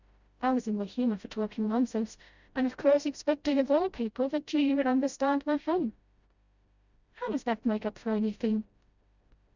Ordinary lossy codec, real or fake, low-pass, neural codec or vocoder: Opus, 64 kbps; fake; 7.2 kHz; codec, 16 kHz, 0.5 kbps, FreqCodec, smaller model